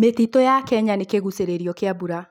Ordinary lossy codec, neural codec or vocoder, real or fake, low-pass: none; none; real; 19.8 kHz